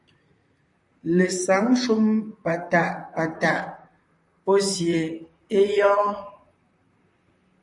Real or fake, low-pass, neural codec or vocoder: fake; 10.8 kHz; vocoder, 44.1 kHz, 128 mel bands, Pupu-Vocoder